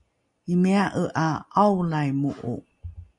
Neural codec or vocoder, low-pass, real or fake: none; 10.8 kHz; real